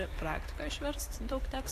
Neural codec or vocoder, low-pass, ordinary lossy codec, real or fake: vocoder, 44.1 kHz, 128 mel bands every 256 samples, BigVGAN v2; 14.4 kHz; AAC, 64 kbps; fake